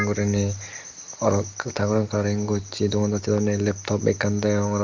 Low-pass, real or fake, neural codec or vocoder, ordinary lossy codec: 7.2 kHz; real; none; Opus, 32 kbps